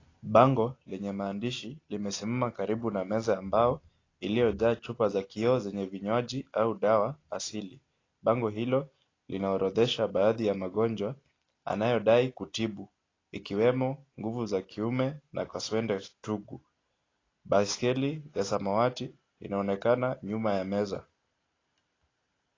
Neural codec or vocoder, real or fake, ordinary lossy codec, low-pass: none; real; AAC, 32 kbps; 7.2 kHz